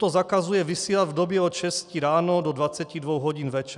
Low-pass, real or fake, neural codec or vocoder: 10.8 kHz; real; none